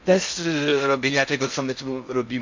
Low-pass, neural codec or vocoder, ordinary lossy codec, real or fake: 7.2 kHz; codec, 16 kHz in and 24 kHz out, 0.6 kbps, FocalCodec, streaming, 4096 codes; MP3, 64 kbps; fake